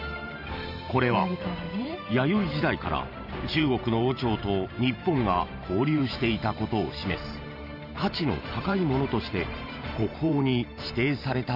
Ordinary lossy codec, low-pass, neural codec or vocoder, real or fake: none; 5.4 kHz; vocoder, 44.1 kHz, 128 mel bands every 512 samples, BigVGAN v2; fake